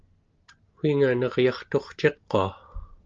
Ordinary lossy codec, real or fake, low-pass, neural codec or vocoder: Opus, 24 kbps; real; 7.2 kHz; none